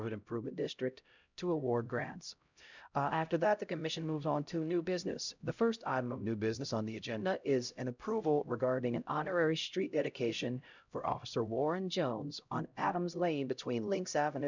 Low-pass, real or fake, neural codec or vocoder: 7.2 kHz; fake; codec, 16 kHz, 0.5 kbps, X-Codec, HuBERT features, trained on LibriSpeech